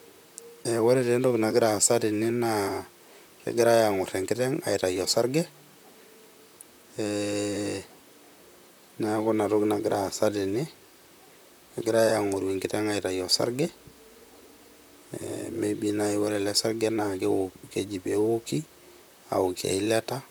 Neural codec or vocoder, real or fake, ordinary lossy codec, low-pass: vocoder, 44.1 kHz, 128 mel bands, Pupu-Vocoder; fake; none; none